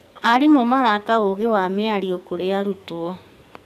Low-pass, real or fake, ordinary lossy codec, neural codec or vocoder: 14.4 kHz; fake; none; codec, 44.1 kHz, 2.6 kbps, SNAC